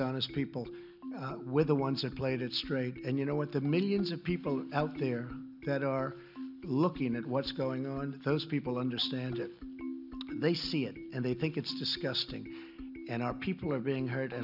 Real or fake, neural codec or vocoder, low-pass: real; none; 5.4 kHz